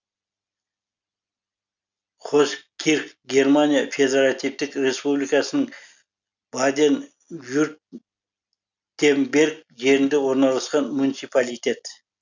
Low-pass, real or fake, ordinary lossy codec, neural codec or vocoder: 7.2 kHz; real; none; none